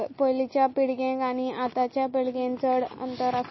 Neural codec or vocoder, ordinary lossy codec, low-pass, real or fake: none; MP3, 24 kbps; 7.2 kHz; real